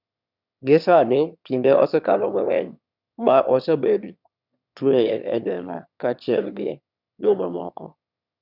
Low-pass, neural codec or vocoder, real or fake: 5.4 kHz; autoencoder, 22.05 kHz, a latent of 192 numbers a frame, VITS, trained on one speaker; fake